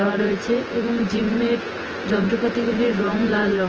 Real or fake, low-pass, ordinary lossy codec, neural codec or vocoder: fake; 7.2 kHz; Opus, 16 kbps; vocoder, 24 kHz, 100 mel bands, Vocos